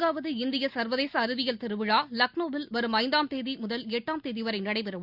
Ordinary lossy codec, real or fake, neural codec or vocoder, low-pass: Opus, 64 kbps; real; none; 5.4 kHz